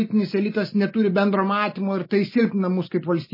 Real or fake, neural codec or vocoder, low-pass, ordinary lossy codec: real; none; 5.4 kHz; MP3, 24 kbps